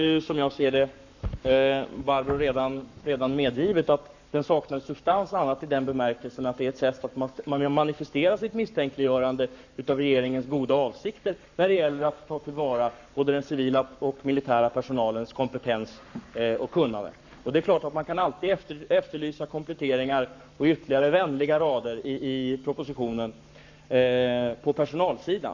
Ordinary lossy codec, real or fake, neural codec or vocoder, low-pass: none; fake; codec, 44.1 kHz, 7.8 kbps, Pupu-Codec; 7.2 kHz